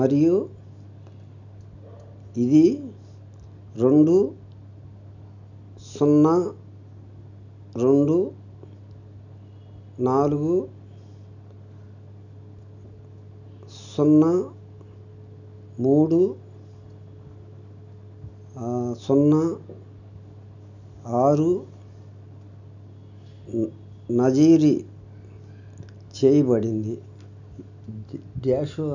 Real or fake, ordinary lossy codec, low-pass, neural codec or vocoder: real; none; 7.2 kHz; none